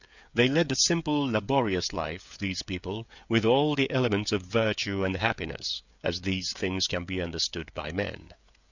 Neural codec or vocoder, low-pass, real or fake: codec, 16 kHz, 16 kbps, FreqCodec, smaller model; 7.2 kHz; fake